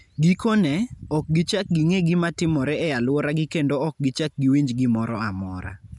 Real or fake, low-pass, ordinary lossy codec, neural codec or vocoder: real; 10.8 kHz; none; none